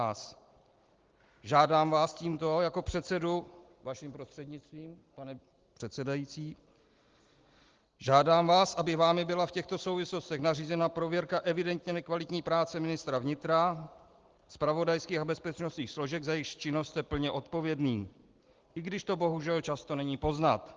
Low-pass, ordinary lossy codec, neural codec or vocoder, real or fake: 7.2 kHz; Opus, 16 kbps; none; real